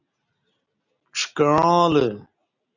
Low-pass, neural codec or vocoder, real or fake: 7.2 kHz; none; real